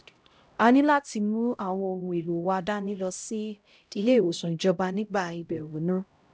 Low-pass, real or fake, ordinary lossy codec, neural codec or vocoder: none; fake; none; codec, 16 kHz, 0.5 kbps, X-Codec, HuBERT features, trained on LibriSpeech